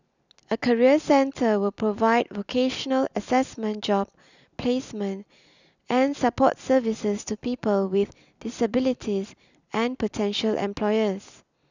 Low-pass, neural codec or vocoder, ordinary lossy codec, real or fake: 7.2 kHz; none; none; real